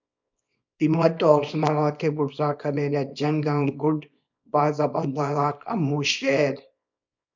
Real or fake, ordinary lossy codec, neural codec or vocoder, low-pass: fake; MP3, 64 kbps; codec, 24 kHz, 0.9 kbps, WavTokenizer, small release; 7.2 kHz